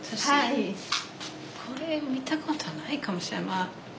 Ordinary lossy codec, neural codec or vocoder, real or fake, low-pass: none; none; real; none